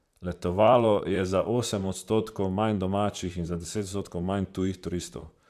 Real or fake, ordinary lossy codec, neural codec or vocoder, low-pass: fake; MP3, 96 kbps; vocoder, 44.1 kHz, 128 mel bands, Pupu-Vocoder; 14.4 kHz